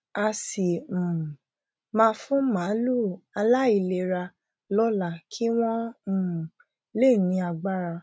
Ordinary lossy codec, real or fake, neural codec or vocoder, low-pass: none; real; none; none